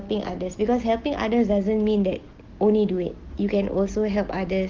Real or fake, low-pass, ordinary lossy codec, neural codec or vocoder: real; 7.2 kHz; Opus, 16 kbps; none